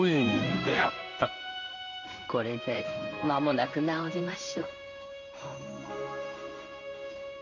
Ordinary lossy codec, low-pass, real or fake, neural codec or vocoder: none; 7.2 kHz; fake; codec, 16 kHz in and 24 kHz out, 1 kbps, XY-Tokenizer